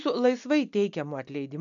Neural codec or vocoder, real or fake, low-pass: none; real; 7.2 kHz